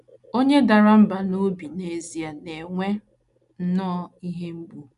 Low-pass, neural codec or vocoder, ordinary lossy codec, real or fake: 10.8 kHz; none; none; real